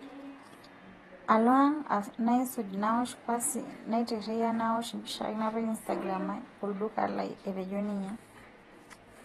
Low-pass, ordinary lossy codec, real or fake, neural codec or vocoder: 19.8 kHz; AAC, 32 kbps; fake; vocoder, 48 kHz, 128 mel bands, Vocos